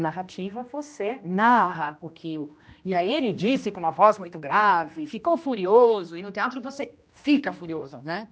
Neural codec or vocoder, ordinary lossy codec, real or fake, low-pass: codec, 16 kHz, 1 kbps, X-Codec, HuBERT features, trained on general audio; none; fake; none